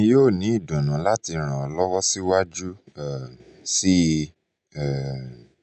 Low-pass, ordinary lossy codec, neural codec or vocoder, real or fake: 9.9 kHz; none; none; real